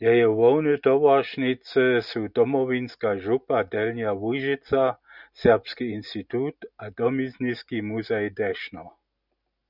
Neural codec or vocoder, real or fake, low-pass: none; real; 5.4 kHz